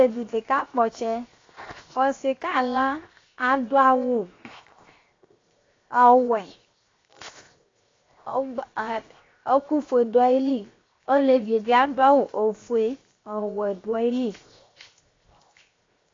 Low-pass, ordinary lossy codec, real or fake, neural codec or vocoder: 7.2 kHz; AAC, 32 kbps; fake; codec, 16 kHz, 0.7 kbps, FocalCodec